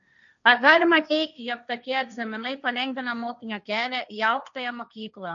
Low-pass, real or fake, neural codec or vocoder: 7.2 kHz; fake; codec, 16 kHz, 1.1 kbps, Voila-Tokenizer